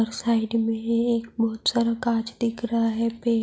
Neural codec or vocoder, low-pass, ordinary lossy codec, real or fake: none; none; none; real